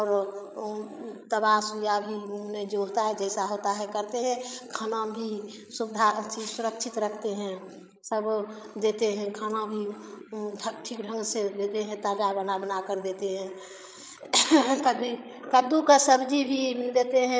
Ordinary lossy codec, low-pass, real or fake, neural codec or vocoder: none; none; fake; codec, 16 kHz, 8 kbps, FreqCodec, larger model